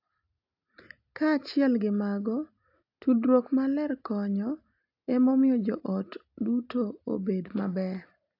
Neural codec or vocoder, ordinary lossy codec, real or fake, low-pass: none; AAC, 48 kbps; real; 5.4 kHz